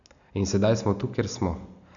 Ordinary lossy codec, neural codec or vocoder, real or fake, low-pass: none; none; real; 7.2 kHz